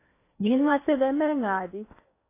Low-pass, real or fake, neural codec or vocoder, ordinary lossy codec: 3.6 kHz; fake; codec, 16 kHz in and 24 kHz out, 0.8 kbps, FocalCodec, streaming, 65536 codes; AAC, 24 kbps